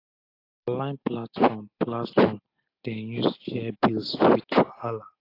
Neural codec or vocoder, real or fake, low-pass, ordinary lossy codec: none; real; 5.4 kHz; AAC, 32 kbps